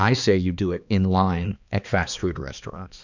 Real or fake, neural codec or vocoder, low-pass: fake; codec, 16 kHz, 2 kbps, X-Codec, HuBERT features, trained on balanced general audio; 7.2 kHz